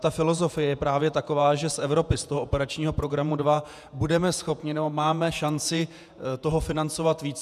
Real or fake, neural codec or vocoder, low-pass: fake; vocoder, 48 kHz, 128 mel bands, Vocos; 14.4 kHz